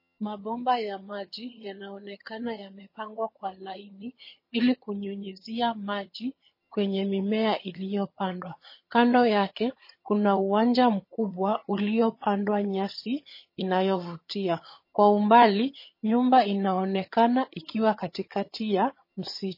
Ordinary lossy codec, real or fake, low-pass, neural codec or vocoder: MP3, 24 kbps; fake; 5.4 kHz; vocoder, 22.05 kHz, 80 mel bands, HiFi-GAN